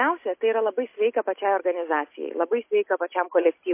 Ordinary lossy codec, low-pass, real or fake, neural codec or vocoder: MP3, 24 kbps; 3.6 kHz; real; none